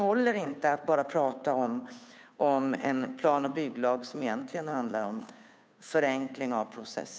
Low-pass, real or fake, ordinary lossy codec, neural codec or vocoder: none; fake; none; codec, 16 kHz, 2 kbps, FunCodec, trained on Chinese and English, 25 frames a second